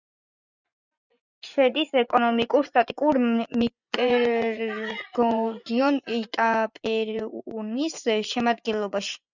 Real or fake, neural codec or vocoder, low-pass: fake; vocoder, 24 kHz, 100 mel bands, Vocos; 7.2 kHz